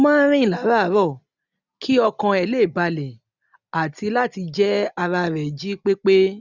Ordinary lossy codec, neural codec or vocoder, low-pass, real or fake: none; none; 7.2 kHz; real